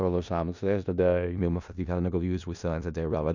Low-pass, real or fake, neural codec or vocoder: 7.2 kHz; fake; codec, 16 kHz in and 24 kHz out, 0.4 kbps, LongCat-Audio-Codec, four codebook decoder